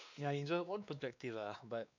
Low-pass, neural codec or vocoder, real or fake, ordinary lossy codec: 7.2 kHz; codec, 16 kHz, 2 kbps, X-Codec, WavLM features, trained on Multilingual LibriSpeech; fake; none